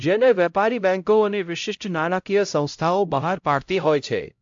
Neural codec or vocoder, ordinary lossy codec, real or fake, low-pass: codec, 16 kHz, 0.5 kbps, X-Codec, HuBERT features, trained on LibriSpeech; AAC, 64 kbps; fake; 7.2 kHz